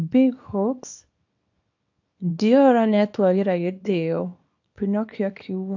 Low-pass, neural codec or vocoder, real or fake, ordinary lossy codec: 7.2 kHz; codec, 24 kHz, 0.9 kbps, WavTokenizer, small release; fake; AAC, 48 kbps